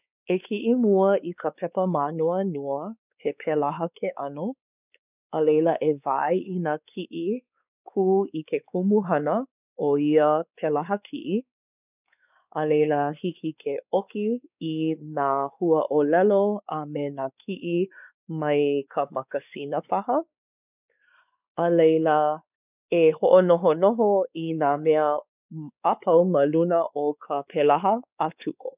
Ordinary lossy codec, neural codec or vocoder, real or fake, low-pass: none; codec, 16 kHz, 4 kbps, X-Codec, WavLM features, trained on Multilingual LibriSpeech; fake; 3.6 kHz